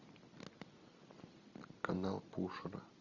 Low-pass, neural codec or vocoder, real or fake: 7.2 kHz; none; real